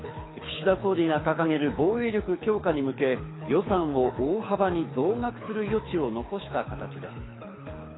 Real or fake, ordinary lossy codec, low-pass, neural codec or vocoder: fake; AAC, 16 kbps; 7.2 kHz; codec, 24 kHz, 6 kbps, HILCodec